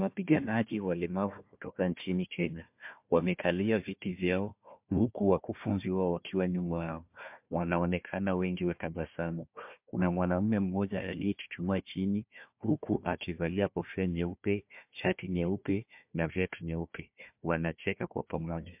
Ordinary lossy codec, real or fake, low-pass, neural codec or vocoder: MP3, 32 kbps; fake; 3.6 kHz; codec, 16 kHz, 1 kbps, FunCodec, trained on Chinese and English, 50 frames a second